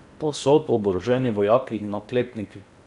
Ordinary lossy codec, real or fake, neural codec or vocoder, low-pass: none; fake; codec, 16 kHz in and 24 kHz out, 0.8 kbps, FocalCodec, streaming, 65536 codes; 10.8 kHz